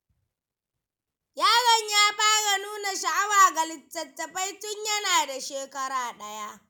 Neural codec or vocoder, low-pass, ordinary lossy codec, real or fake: none; none; none; real